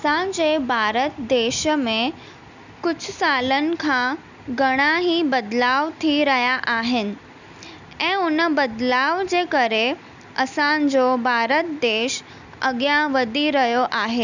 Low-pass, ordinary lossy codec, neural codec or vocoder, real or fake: 7.2 kHz; none; none; real